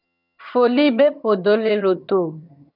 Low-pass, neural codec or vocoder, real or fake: 5.4 kHz; vocoder, 22.05 kHz, 80 mel bands, HiFi-GAN; fake